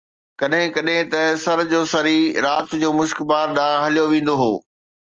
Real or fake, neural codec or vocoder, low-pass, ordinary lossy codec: real; none; 7.2 kHz; Opus, 24 kbps